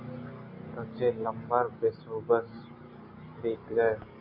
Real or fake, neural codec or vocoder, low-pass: real; none; 5.4 kHz